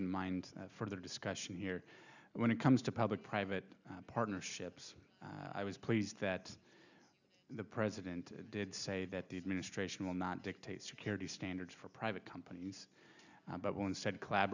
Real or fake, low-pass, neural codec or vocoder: real; 7.2 kHz; none